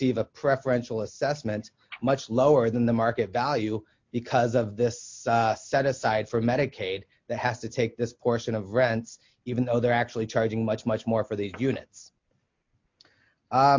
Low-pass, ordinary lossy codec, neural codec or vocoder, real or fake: 7.2 kHz; MP3, 48 kbps; none; real